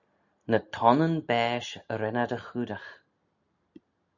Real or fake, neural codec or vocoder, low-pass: real; none; 7.2 kHz